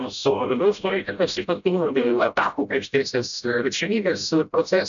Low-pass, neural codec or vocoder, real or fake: 7.2 kHz; codec, 16 kHz, 0.5 kbps, FreqCodec, smaller model; fake